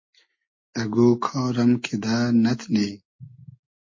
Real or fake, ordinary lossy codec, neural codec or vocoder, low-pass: real; MP3, 32 kbps; none; 7.2 kHz